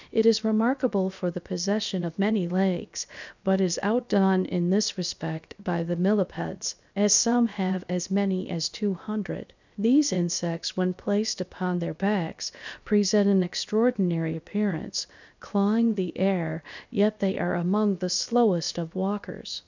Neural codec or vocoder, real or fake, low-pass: codec, 16 kHz, 0.7 kbps, FocalCodec; fake; 7.2 kHz